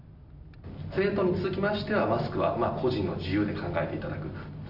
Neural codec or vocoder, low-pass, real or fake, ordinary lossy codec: none; 5.4 kHz; real; none